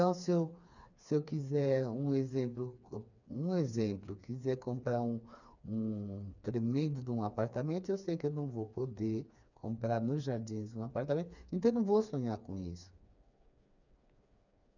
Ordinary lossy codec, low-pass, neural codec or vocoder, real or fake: none; 7.2 kHz; codec, 16 kHz, 4 kbps, FreqCodec, smaller model; fake